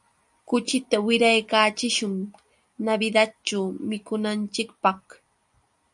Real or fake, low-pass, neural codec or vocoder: real; 10.8 kHz; none